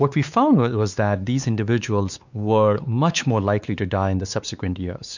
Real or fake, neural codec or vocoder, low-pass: fake; codec, 16 kHz, 4 kbps, X-Codec, WavLM features, trained on Multilingual LibriSpeech; 7.2 kHz